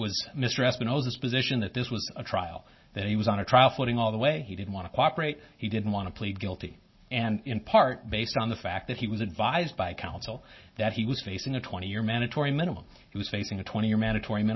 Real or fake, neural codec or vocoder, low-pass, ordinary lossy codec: real; none; 7.2 kHz; MP3, 24 kbps